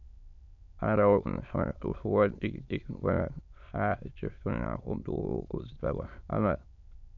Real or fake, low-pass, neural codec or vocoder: fake; 7.2 kHz; autoencoder, 22.05 kHz, a latent of 192 numbers a frame, VITS, trained on many speakers